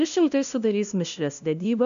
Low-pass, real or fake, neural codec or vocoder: 7.2 kHz; fake; codec, 16 kHz, 0.5 kbps, FunCodec, trained on LibriTTS, 25 frames a second